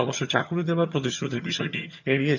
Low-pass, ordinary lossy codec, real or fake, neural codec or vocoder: 7.2 kHz; none; fake; vocoder, 22.05 kHz, 80 mel bands, HiFi-GAN